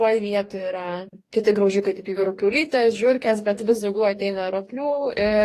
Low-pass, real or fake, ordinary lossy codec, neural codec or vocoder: 14.4 kHz; fake; AAC, 48 kbps; codec, 44.1 kHz, 2.6 kbps, DAC